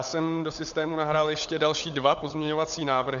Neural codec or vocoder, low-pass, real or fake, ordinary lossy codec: codec, 16 kHz, 16 kbps, FunCodec, trained on LibriTTS, 50 frames a second; 7.2 kHz; fake; MP3, 96 kbps